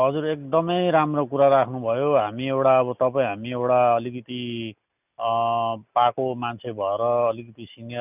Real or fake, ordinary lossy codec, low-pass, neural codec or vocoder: real; none; 3.6 kHz; none